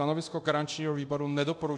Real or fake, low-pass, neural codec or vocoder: fake; 10.8 kHz; codec, 24 kHz, 0.9 kbps, DualCodec